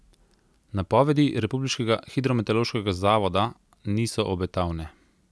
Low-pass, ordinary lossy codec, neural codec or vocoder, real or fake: none; none; none; real